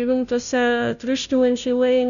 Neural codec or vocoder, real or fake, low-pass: codec, 16 kHz, 0.5 kbps, FunCodec, trained on Chinese and English, 25 frames a second; fake; 7.2 kHz